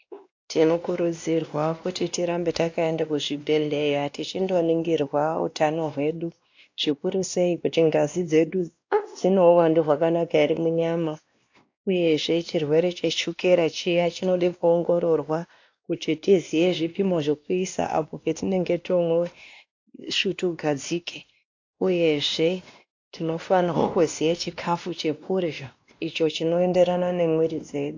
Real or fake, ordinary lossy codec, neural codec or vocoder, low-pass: fake; AAC, 48 kbps; codec, 16 kHz, 1 kbps, X-Codec, WavLM features, trained on Multilingual LibriSpeech; 7.2 kHz